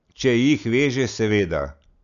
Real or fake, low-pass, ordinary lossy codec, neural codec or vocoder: real; 7.2 kHz; none; none